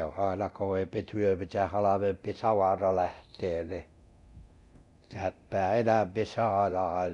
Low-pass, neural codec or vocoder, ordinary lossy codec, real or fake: 10.8 kHz; codec, 24 kHz, 0.9 kbps, DualCodec; none; fake